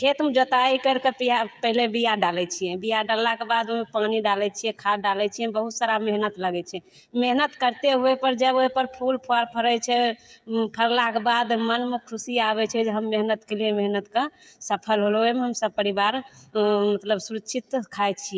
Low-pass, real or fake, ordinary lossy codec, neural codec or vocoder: none; fake; none; codec, 16 kHz, 16 kbps, FreqCodec, smaller model